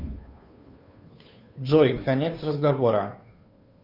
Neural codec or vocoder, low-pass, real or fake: codec, 16 kHz, 2 kbps, FunCodec, trained on Chinese and English, 25 frames a second; 5.4 kHz; fake